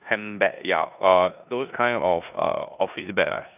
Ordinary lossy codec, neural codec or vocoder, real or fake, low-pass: none; codec, 16 kHz in and 24 kHz out, 0.9 kbps, LongCat-Audio-Codec, four codebook decoder; fake; 3.6 kHz